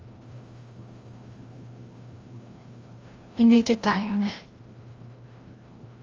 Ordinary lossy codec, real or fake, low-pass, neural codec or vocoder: Opus, 32 kbps; fake; 7.2 kHz; codec, 16 kHz, 1 kbps, FreqCodec, larger model